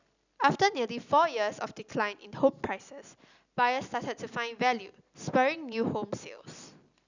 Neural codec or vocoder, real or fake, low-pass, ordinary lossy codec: none; real; 7.2 kHz; none